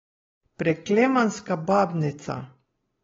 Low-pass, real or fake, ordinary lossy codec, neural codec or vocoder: 7.2 kHz; real; AAC, 24 kbps; none